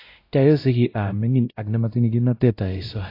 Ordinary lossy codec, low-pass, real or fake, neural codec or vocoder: AAC, 32 kbps; 5.4 kHz; fake; codec, 16 kHz, 0.5 kbps, X-Codec, WavLM features, trained on Multilingual LibriSpeech